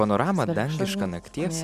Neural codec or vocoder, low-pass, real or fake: none; 14.4 kHz; real